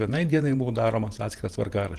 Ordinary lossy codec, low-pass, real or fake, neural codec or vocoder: Opus, 24 kbps; 14.4 kHz; fake; vocoder, 48 kHz, 128 mel bands, Vocos